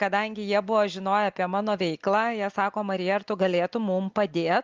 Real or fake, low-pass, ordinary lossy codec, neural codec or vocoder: real; 7.2 kHz; Opus, 24 kbps; none